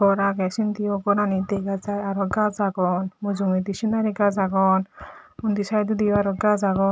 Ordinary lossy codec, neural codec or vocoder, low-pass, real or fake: none; none; none; real